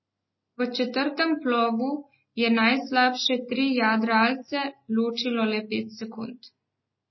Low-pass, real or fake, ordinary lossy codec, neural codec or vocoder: 7.2 kHz; real; MP3, 24 kbps; none